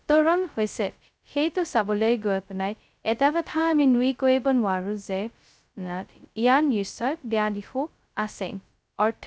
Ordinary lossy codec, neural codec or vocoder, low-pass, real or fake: none; codec, 16 kHz, 0.2 kbps, FocalCodec; none; fake